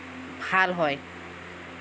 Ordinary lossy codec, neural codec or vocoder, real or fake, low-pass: none; none; real; none